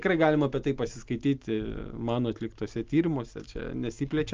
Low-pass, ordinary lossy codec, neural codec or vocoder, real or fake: 7.2 kHz; Opus, 24 kbps; none; real